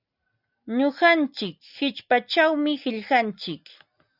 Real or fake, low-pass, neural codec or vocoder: real; 5.4 kHz; none